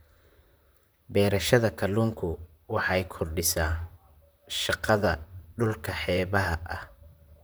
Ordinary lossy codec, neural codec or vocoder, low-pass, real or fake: none; vocoder, 44.1 kHz, 128 mel bands, Pupu-Vocoder; none; fake